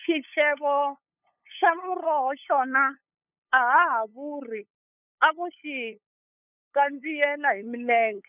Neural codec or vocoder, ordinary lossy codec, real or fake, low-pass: codec, 16 kHz, 8 kbps, FunCodec, trained on LibriTTS, 25 frames a second; none; fake; 3.6 kHz